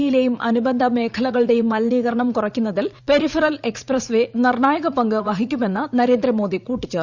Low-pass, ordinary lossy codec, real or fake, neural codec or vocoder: 7.2 kHz; Opus, 64 kbps; fake; vocoder, 44.1 kHz, 80 mel bands, Vocos